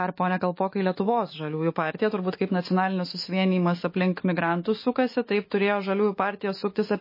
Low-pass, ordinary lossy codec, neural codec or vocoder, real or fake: 5.4 kHz; MP3, 24 kbps; autoencoder, 48 kHz, 128 numbers a frame, DAC-VAE, trained on Japanese speech; fake